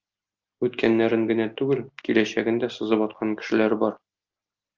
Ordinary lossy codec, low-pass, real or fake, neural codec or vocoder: Opus, 24 kbps; 7.2 kHz; real; none